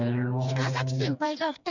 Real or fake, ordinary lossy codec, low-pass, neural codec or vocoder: fake; none; 7.2 kHz; codec, 16 kHz, 2 kbps, FreqCodec, smaller model